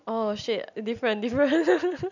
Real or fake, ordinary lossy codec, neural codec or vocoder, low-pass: real; none; none; 7.2 kHz